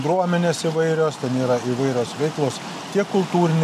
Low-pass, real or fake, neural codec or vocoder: 14.4 kHz; real; none